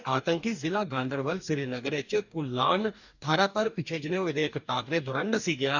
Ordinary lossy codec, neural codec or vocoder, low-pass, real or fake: none; codec, 44.1 kHz, 2.6 kbps, DAC; 7.2 kHz; fake